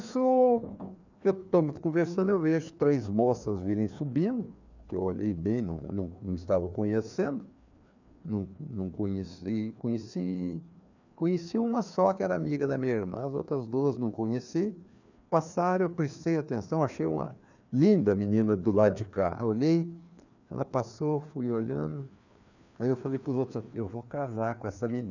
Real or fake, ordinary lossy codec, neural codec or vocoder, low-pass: fake; none; codec, 16 kHz, 2 kbps, FreqCodec, larger model; 7.2 kHz